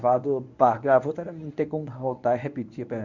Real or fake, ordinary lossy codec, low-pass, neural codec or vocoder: fake; none; 7.2 kHz; codec, 24 kHz, 0.9 kbps, WavTokenizer, medium speech release version 1